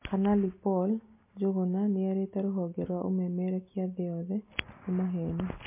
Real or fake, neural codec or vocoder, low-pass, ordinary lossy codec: real; none; 3.6 kHz; MP3, 24 kbps